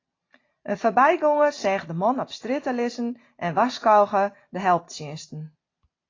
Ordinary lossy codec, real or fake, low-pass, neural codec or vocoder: AAC, 32 kbps; real; 7.2 kHz; none